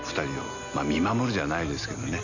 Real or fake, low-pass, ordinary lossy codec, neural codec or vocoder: real; 7.2 kHz; none; none